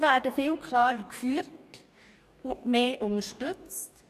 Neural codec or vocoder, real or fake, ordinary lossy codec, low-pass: codec, 44.1 kHz, 2.6 kbps, DAC; fake; none; 14.4 kHz